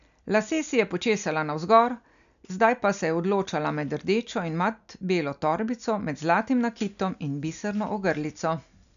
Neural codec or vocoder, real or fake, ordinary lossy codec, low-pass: none; real; none; 7.2 kHz